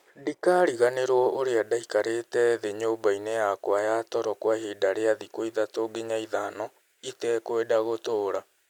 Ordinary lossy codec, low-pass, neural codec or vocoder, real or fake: none; 19.8 kHz; none; real